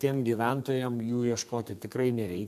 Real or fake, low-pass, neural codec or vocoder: fake; 14.4 kHz; codec, 32 kHz, 1.9 kbps, SNAC